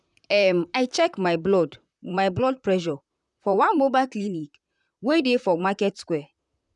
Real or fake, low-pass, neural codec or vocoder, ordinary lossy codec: fake; 10.8 kHz; vocoder, 44.1 kHz, 128 mel bands, Pupu-Vocoder; none